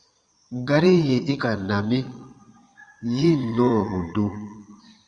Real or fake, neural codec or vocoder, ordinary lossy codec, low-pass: fake; vocoder, 22.05 kHz, 80 mel bands, Vocos; Opus, 64 kbps; 9.9 kHz